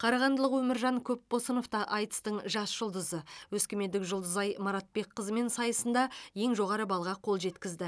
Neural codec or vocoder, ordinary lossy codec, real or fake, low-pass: none; none; real; none